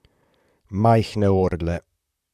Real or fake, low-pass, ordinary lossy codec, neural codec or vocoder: fake; 14.4 kHz; none; vocoder, 44.1 kHz, 128 mel bands, Pupu-Vocoder